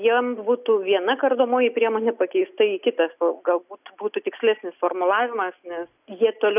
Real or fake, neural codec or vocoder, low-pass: real; none; 3.6 kHz